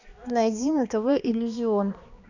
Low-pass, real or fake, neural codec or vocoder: 7.2 kHz; fake; codec, 16 kHz, 2 kbps, X-Codec, HuBERT features, trained on balanced general audio